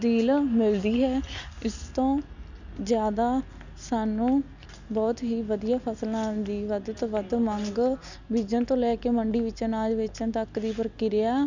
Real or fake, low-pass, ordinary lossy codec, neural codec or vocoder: real; 7.2 kHz; none; none